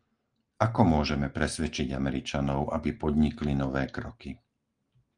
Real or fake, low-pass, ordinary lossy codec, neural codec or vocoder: real; 9.9 kHz; Opus, 24 kbps; none